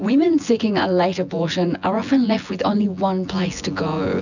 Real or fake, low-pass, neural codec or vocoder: fake; 7.2 kHz; vocoder, 24 kHz, 100 mel bands, Vocos